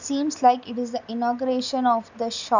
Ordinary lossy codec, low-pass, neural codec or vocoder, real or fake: none; 7.2 kHz; none; real